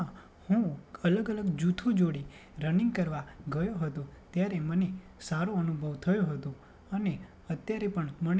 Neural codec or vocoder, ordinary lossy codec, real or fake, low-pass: none; none; real; none